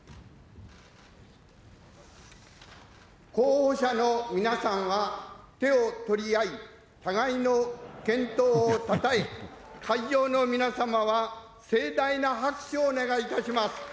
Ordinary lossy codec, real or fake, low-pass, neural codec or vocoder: none; real; none; none